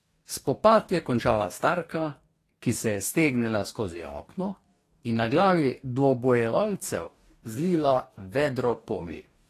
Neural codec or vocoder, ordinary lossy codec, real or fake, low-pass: codec, 44.1 kHz, 2.6 kbps, DAC; AAC, 48 kbps; fake; 14.4 kHz